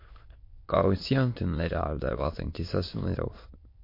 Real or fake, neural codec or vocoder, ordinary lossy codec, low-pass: fake; autoencoder, 22.05 kHz, a latent of 192 numbers a frame, VITS, trained on many speakers; MP3, 32 kbps; 5.4 kHz